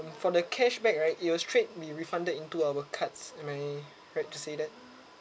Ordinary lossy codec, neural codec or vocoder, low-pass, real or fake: none; none; none; real